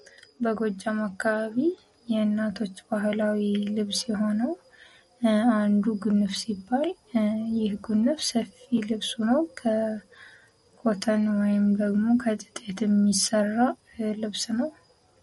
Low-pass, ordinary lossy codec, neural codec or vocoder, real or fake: 19.8 kHz; MP3, 48 kbps; none; real